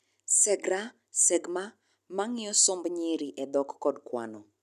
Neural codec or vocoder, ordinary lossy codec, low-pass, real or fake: none; none; 14.4 kHz; real